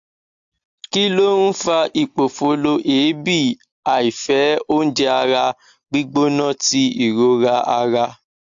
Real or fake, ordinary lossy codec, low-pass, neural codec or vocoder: real; AAC, 64 kbps; 7.2 kHz; none